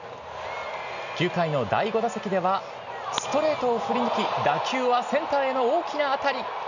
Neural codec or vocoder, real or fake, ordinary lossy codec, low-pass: none; real; none; 7.2 kHz